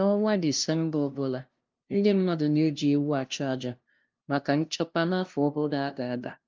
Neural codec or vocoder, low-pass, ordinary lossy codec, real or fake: codec, 16 kHz, 0.5 kbps, FunCodec, trained on LibriTTS, 25 frames a second; 7.2 kHz; Opus, 32 kbps; fake